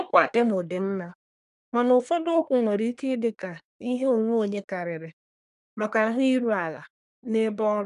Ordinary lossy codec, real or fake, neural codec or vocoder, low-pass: none; fake; codec, 24 kHz, 1 kbps, SNAC; 10.8 kHz